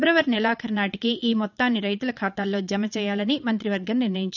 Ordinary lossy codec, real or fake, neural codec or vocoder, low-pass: none; fake; vocoder, 22.05 kHz, 80 mel bands, Vocos; 7.2 kHz